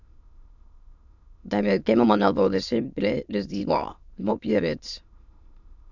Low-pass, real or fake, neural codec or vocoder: 7.2 kHz; fake; autoencoder, 22.05 kHz, a latent of 192 numbers a frame, VITS, trained on many speakers